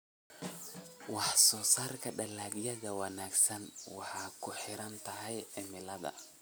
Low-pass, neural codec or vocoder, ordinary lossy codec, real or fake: none; none; none; real